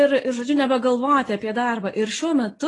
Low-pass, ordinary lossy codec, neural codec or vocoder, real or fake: 10.8 kHz; AAC, 32 kbps; none; real